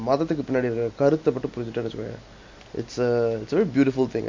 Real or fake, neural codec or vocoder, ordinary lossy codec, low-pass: real; none; MP3, 48 kbps; 7.2 kHz